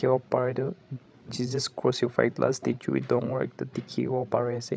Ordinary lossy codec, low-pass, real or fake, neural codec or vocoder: none; none; fake; codec, 16 kHz, 16 kbps, FreqCodec, larger model